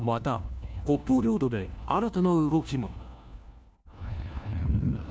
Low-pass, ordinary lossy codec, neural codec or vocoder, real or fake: none; none; codec, 16 kHz, 1 kbps, FunCodec, trained on LibriTTS, 50 frames a second; fake